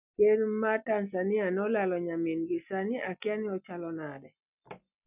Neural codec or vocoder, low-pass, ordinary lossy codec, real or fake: none; 3.6 kHz; none; real